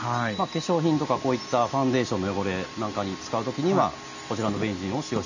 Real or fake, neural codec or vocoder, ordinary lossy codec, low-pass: real; none; none; 7.2 kHz